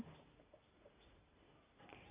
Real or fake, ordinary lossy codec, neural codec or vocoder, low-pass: real; Opus, 64 kbps; none; 3.6 kHz